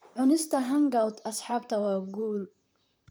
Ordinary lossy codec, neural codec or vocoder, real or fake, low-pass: none; vocoder, 44.1 kHz, 128 mel bands, Pupu-Vocoder; fake; none